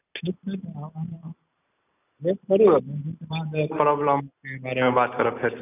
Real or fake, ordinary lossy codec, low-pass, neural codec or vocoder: real; none; 3.6 kHz; none